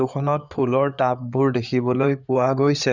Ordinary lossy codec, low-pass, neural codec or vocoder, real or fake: none; 7.2 kHz; codec, 16 kHz in and 24 kHz out, 2.2 kbps, FireRedTTS-2 codec; fake